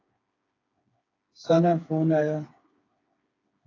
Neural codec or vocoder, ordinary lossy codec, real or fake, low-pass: codec, 16 kHz, 4 kbps, FreqCodec, smaller model; AAC, 32 kbps; fake; 7.2 kHz